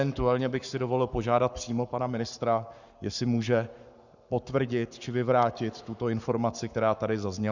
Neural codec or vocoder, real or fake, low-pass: codec, 44.1 kHz, 7.8 kbps, Pupu-Codec; fake; 7.2 kHz